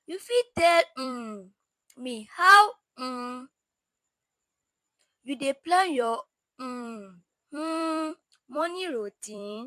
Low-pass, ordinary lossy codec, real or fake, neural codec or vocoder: 14.4 kHz; MP3, 64 kbps; fake; vocoder, 44.1 kHz, 128 mel bands every 256 samples, BigVGAN v2